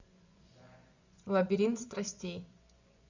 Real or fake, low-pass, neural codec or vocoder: fake; 7.2 kHz; vocoder, 24 kHz, 100 mel bands, Vocos